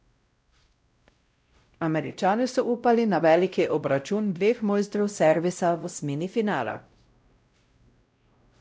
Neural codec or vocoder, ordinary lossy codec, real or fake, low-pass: codec, 16 kHz, 0.5 kbps, X-Codec, WavLM features, trained on Multilingual LibriSpeech; none; fake; none